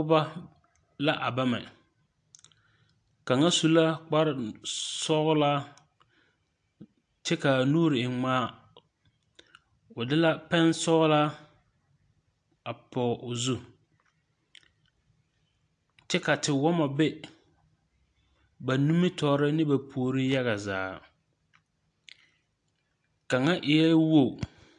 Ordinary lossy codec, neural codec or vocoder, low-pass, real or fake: AAC, 64 kbps; none; 9.9 kHz; real